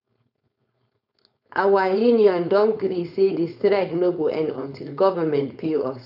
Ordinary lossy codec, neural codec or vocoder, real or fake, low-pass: none; codec, 16 kHz, 4.8 kbps, FACodec; fake; 5.4 kHz